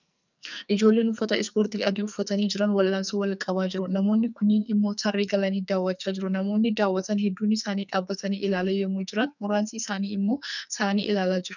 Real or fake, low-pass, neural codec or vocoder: fake; 7.2 kHz; codec, 44.1 kHz, 2.6 kbps, SNAC